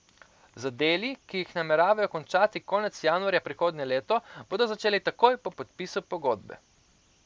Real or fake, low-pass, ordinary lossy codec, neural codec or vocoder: real; none; none; none